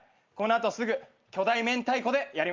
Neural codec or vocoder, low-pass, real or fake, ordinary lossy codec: none; 7.2 kHz; real; Opus, 32 kbps